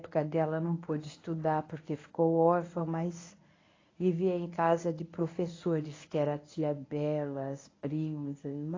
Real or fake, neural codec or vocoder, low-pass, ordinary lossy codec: fake; codec, 24 kHz, 0.9 kbps, WavTokenizer, medium speech release version 1; 7.2 kHz; AAC, 32 kbps